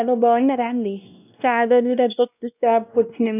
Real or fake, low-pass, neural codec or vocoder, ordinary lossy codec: fake; 3.6 kHz; codec, 16 kHz, 1 kbps, X-Codec, WavLM features, trained on Multilingual LibriSpeech; none